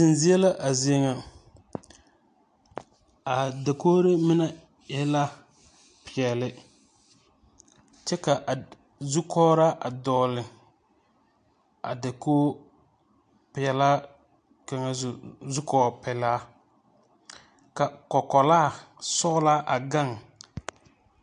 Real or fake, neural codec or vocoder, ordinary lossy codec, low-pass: real; none; AAC, 64 kbps; 9.9 kHz